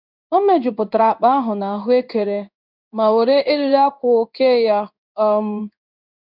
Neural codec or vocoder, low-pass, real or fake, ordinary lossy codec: codec, 16 kHz in and 24 kHz out, 1 kbps, XY-Tokenizer; 5.4 kHz; fake; none